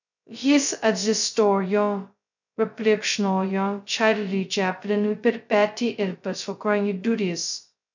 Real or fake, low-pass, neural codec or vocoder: fake; 7.2 kHz; codec, 16 kHz, 0.2 kbps, FocalCodec